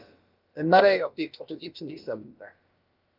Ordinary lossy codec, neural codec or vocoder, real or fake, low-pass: Opus, 32 kbps; codec, 16 kHz, about 1 kbps, DyCAST, with the encoder's durations; fake; 5.4 kHz